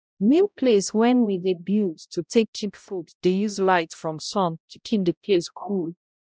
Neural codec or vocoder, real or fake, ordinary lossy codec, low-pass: codec, 16 kHz, 0.5 kbps, X-Codec, HuBERT features, trained on balanced general audio; fake; none; none